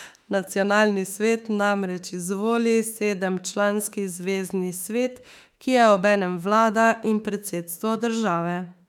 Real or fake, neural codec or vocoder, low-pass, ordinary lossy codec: fake; autoencoder, 48 kHz, 32 numbers a frame, DAC-VAE, trained on Japanese speech; 19.8 kHz; none